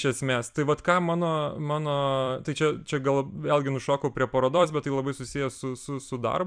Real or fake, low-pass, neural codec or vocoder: fake; 9.9 kHz; vocoder, 44.1 kHz, 128 mel bands every 256 samples, BigVGAN v2